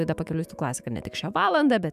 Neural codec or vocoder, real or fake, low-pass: autoencoder, 48 kHz, 128 numbers a frame, DAC-VAE, trained on Japanese speech; fake; 14.4 kHz